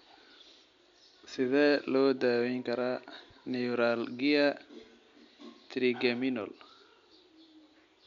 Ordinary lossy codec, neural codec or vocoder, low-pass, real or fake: MP3, 64 kbps; none; 7.2 kHz; real